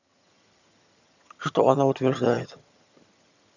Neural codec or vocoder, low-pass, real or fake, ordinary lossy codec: vocoder, 22.05 kHz, 80 mel bands, HiFi-GAN; 7.2 kHz; fake; none